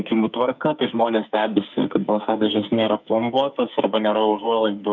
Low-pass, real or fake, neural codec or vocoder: 7.2 kHz; fake; codec, 44.1 kHz, 2.6 kbps, SNAC